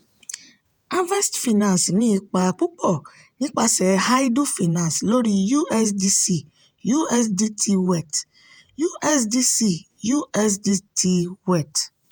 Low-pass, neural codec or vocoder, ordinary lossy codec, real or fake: none; vocoder, 48 kHz, 128 mel bands, Vocos; none; fake